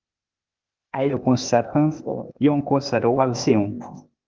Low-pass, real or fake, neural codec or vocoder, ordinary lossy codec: 7.2 kHz; fake; codec, 16 kHz, 0.8 kbps, ZipCodec; Opus, 32 kbps